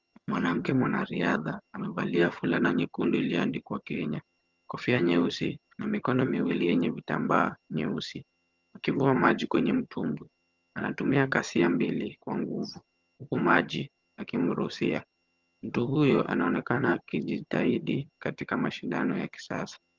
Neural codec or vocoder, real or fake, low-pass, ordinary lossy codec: vocoder, 22.05 kHz, 80 mel bands, HiFi-GAN; fake; 7.2 kHz; Opus, 32 kbps